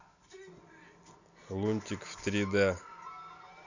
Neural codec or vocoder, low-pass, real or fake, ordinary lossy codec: none; 7.2 kHz; real; none